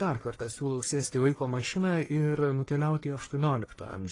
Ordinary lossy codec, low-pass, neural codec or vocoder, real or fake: AAC, 32 kbps; 10.8 kHz; codec, 44.1 kHz, 1.7 kbps, Pupu-Codec; fake